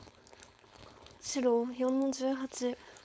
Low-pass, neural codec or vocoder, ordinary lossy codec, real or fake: none; codec, 16 kHz, 4.8 kbps, FACodec; none; fake